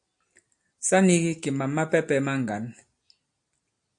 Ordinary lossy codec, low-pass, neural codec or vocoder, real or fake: AAC, 64 kbps; 9.9 kHz; none; real